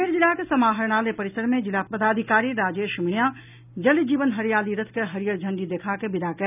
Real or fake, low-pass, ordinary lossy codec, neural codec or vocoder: real; 3.6 kHz; none; none